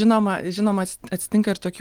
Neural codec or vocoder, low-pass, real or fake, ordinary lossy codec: none; 19.8 kHz; real; Opus, 24 kbps